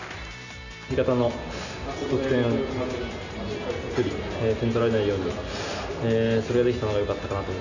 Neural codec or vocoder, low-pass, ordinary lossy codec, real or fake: none; 7.2 kHz; none; real